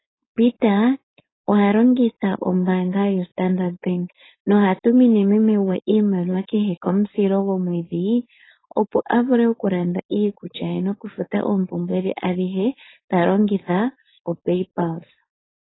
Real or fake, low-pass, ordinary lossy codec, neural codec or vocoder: fake; 7.2 kHz; AAC, 16 kbps; codec, 16 kHz, 4.8 kbps, FACodec